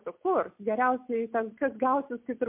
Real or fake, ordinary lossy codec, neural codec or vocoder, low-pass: real; MP3, 32 kbps; none; 3.6 kHz